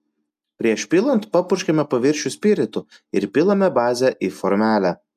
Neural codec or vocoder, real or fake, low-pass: none; real; 14.4 kHz